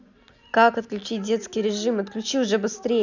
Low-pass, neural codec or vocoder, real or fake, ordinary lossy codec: 7.2 kHz; none; real; none